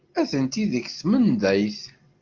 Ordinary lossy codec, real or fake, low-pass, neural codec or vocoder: Opus, 32 kbps; real; 7.2 kHz; none